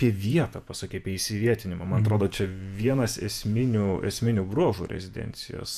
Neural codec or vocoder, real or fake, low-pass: vocoder, 48 kHz, 128 mel bands, Vocos; fake; 14.4 kHz